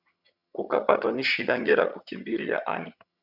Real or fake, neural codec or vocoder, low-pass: fake; vocoder, 22.05 kHz, 80 mel bands, HiFi-GAN; 5.4 kHz